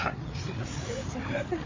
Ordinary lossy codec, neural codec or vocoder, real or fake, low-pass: MP3, 32 kbps; codec, 16 kHz, 8 kbps, FreqCodec, larger model; fake; 7.2 kHz